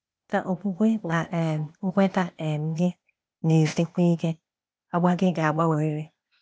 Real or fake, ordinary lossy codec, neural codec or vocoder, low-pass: fake; none; codec, 16 kHz, 0.8 kbps, ZipCodec; none